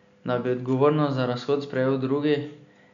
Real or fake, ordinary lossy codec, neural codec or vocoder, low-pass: real; none; none; 7.2 kHz